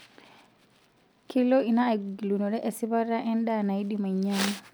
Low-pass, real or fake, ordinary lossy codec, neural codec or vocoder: none; real; none; none